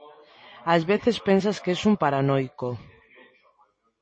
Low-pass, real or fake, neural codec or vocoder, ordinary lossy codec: 9.9 kHz; real; none; MP3, 32 kbps